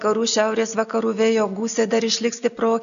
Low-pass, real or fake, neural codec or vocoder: 7.2 kHz; real; none